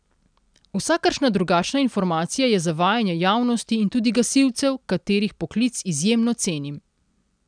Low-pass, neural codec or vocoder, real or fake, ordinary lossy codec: 9.9 kHz; none; real; none